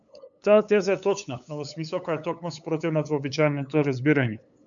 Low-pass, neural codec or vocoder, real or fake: 7.2 kHz; codec, 16 kHz, 8 kbps, FunCodec, trained on LibriTTS, 25 frames a second; fake